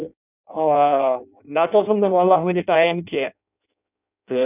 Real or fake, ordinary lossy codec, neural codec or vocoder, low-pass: fake; none; codec, 16 kHz in and 24 kHz out, 0.6 kbps, FireRedTTS-2 codec; 3.6 kHz